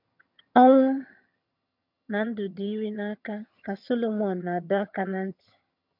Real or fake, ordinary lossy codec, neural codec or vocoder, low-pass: fake; MP3, 48 kbps; vocoder, 22.05 kHz, 80 mel bands, HiFi-GAN; 5.4 kHz